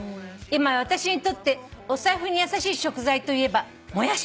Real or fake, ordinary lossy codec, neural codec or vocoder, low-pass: real; none; none; none